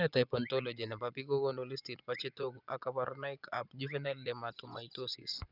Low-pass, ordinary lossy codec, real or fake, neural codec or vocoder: 5.4 kHz; none; fake; vocoder, 24 kHz, 100 mel bands, Vocos